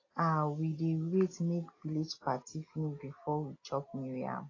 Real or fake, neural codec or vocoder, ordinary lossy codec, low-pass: real; none; AAC, 32 kbps; 7.2 kHz